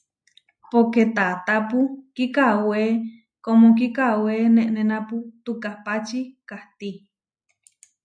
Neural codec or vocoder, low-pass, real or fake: none; 9.9 kHz; real